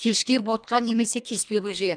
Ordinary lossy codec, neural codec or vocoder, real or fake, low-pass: none; codec, 24 kHz, 1.5 kbps, HILCodec; fake; 9.9 kHz